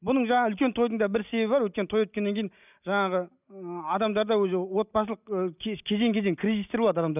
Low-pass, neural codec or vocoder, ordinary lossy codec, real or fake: 3.6 kHz; none; none; real